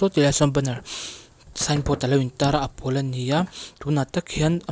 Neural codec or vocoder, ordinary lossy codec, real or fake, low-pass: none; none; real; none